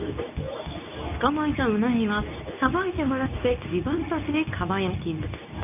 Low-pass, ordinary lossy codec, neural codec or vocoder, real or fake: 3.6 kHz; none; codec, 24 kHz, 0.9 kbps, WavTokenizer, medium speech release version 2; fake